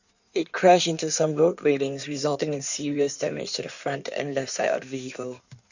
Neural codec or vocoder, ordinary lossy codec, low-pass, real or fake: codec, 16 kHz in and 24 kHz out, 1.1 kbps, FireRedTTS-2 codec; none; 7.2 kHz; fake